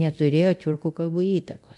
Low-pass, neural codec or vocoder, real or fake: 10.8 kHz; codec, 24 kHz, 0.9 kbps, DualCodec; fake